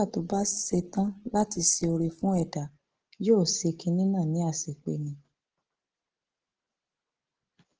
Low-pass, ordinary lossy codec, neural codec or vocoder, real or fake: 7.2 kHz; Opus, 16 kbps; none; real